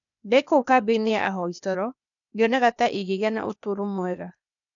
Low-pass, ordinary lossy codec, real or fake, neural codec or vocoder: 7.2 kHz; none; fake; codec, 16 kHz, 0.8 kbps, ZipCodec